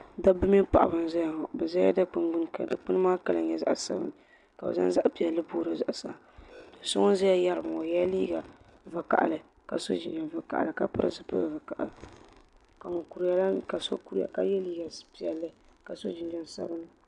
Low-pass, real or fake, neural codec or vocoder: 10.8 kHz; real; none